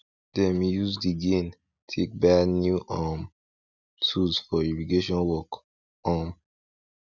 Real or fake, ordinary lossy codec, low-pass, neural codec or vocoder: real; none; 7.2 kHz; none